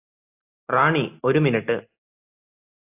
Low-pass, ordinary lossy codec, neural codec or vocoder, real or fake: 3.6 kHz; AAC, 24 kbps; none; real